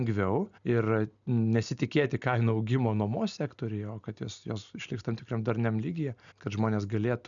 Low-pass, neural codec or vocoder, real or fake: 7.2 kHz; none; real